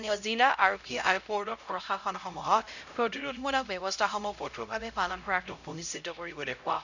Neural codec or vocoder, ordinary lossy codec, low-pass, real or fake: codec, 16 kHz, 0.5 kbps, X-Codec, HuBERT features, trained on LibriSpeech; none; 7.2 kHz; fake